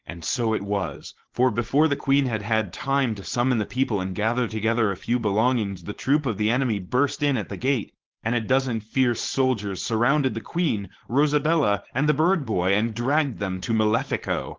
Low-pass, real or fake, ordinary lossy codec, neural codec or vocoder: 7.2 kHz; fake; Opus, 16 kbps; codec, 16 kHz, 4.8 kbps, FACodec